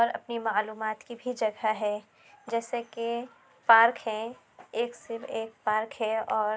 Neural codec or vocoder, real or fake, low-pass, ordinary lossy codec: none; real; none; none